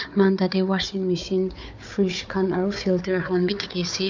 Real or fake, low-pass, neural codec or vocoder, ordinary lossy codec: fake; 7.2 kHz; codec, 16 kHz, 4 kbps, FunCodec, trained on Chinese and English, 50 frames a second; MP3, 64 kbps